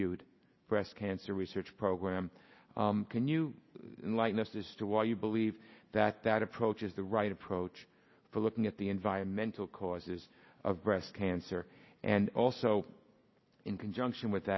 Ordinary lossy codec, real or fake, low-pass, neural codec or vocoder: MP3, 24 kbps; real; 7.2 kHz; none